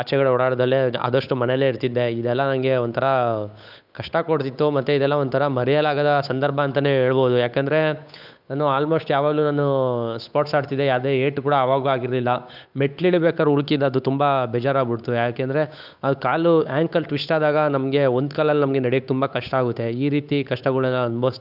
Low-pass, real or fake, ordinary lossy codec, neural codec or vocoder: 5.4 kHz; fake; none; codec, 16 kHz, 8 kbps, FunCodec, trained on Chinese and English, 25 frames a second